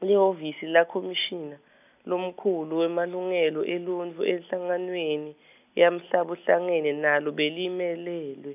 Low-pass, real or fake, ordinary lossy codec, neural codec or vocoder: 3.6 kHz; real; none; none